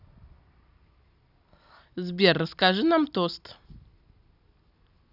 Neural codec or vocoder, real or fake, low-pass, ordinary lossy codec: none; real; 5.4 kHz; none